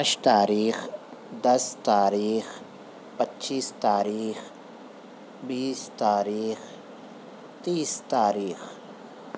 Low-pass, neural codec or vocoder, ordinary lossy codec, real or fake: none; none; none; real